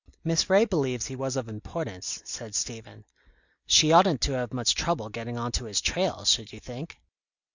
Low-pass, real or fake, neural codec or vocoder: 7.2 kHz; real; none